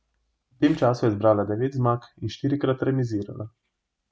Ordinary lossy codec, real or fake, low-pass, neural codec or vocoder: none; real; none; none